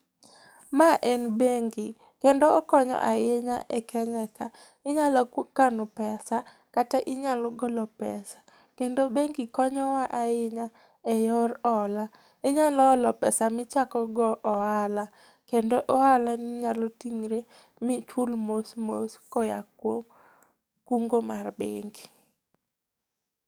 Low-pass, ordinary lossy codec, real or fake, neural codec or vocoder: none; none; fake; codec, 44.1 kHz, 7.8 kbps, DAC